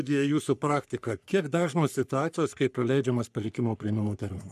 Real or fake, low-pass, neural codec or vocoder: fake; 14.4 kHz; codec, 44.1 kHz, 3.4 kbps, Pupu-Codec